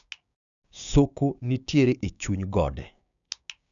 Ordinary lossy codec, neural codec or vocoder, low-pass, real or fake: none; codec, 16 kHz, 6 kbps, DAC; 7.2 kHz; fake